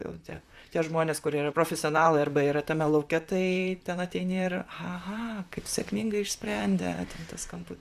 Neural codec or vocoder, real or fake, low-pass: vocoder, 44.1 kHz, 128 mel bands, Pupu-Vocoder; fake; 14.4 kHz